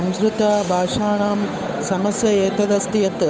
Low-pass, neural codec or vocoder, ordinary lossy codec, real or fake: none; codec, 16 kHz, 8 kbps, FunCodec, trained on Chinese and English, 25 frames a second; none; fake